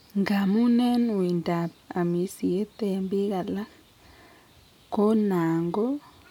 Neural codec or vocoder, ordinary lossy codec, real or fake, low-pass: none; none; real; 19.8 kHz